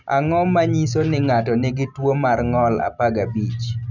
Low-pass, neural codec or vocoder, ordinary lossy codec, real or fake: 7.2 kHz; none; none; real